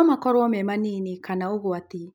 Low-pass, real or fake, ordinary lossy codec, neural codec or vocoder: 19.8 kHz; real; none; none